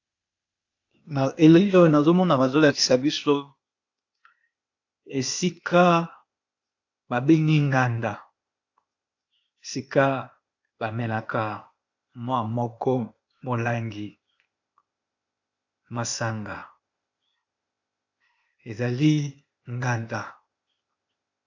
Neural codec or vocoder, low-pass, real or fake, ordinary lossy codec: codec, 16 kHz, 0.8 kbps, ZipCodec; 7.2 kHz; fake; AAC, 48 kbps